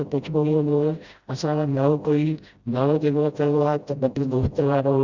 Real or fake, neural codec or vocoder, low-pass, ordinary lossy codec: fake; codec, 16 kHz, 0.5 kbps, FreqCodec, smaller model; 7.2 kHz; Opus, 64 kbps